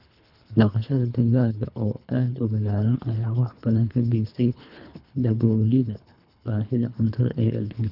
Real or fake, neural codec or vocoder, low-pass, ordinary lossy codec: fake; codec, 24 kHz, 3 kbps, HILCodec; 5.4 kHz; none